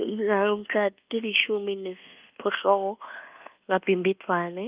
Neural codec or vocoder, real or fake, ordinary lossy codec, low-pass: codec, 24 kHz, 1.2 kbps, DualCodec; fake; Opus, 32 kbps; 3.6 kHz